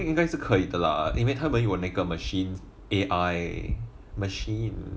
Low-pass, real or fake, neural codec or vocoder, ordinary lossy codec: none; real; none; none